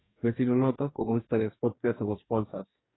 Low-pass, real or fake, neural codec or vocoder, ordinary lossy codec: 7.2 kHz; fake; codec, 44.1 kHz, 2.6 kbps, DAC; AAC, 16 kbps